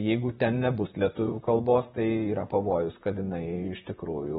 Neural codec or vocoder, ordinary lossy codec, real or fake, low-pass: vocoder, 44.1 kHz, 128 mel bands, Pupu-Vocoder; AAC, 16 kbps; fake; 19.8 kHz